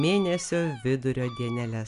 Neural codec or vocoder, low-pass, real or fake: none; 10.8 kHz; real